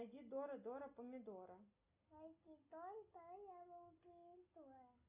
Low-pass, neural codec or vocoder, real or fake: 3.6 kHz; none; real